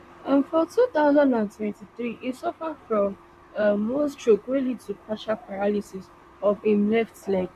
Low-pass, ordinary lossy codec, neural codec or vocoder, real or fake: 14.4 kHz; MP3, 96 kbps; vocoder, 44.1 kHz, 128 mel bands, Pupu-Vocoder; fake